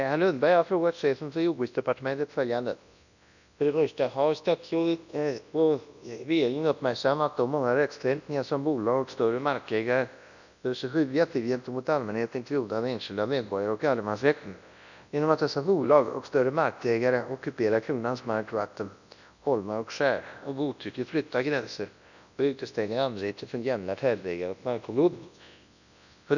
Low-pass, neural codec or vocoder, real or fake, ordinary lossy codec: 7.2 kHz; codec, 24 kHz, 0.9 kbps, WavTokenizer, large speech release; fake; none